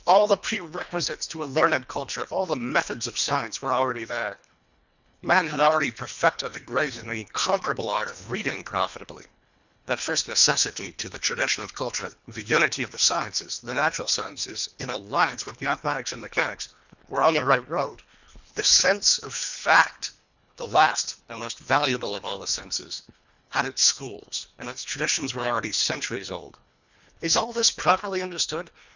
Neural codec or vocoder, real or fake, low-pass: codec, 24 kHz, 1.5 kbps, HILCodec; fake; 7.2 kHz